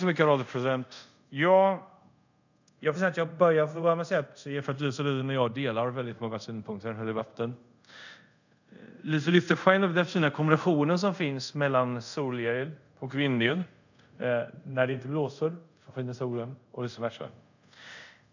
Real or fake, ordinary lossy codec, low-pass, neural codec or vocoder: fake; none; 7.2 kHz; codec, 24 kHz, 0.5 kbps, DualCodec